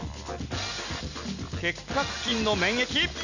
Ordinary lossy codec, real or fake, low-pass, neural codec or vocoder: none; real; 7.2 kHz; none